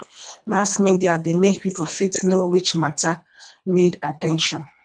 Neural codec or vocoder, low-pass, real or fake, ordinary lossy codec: codec, 24 kHz, 1.5 kbps, HILCodec; 9.9 kHz; fake; none